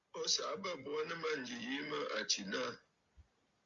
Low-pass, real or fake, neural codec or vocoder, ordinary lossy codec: 7.2 kHz; real; none; Opus, 64 kbps